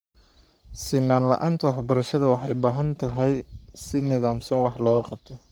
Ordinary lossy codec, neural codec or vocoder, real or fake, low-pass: none; codec, 44.1 kHz, 3.4 kbps, Pupu-Codec; fake; none